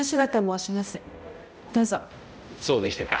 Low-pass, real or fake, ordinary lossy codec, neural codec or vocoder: none; fake; none; codec, 16 kHz, 0.5 kbps, X-Codec, HuBERT features, trained on balanced general audio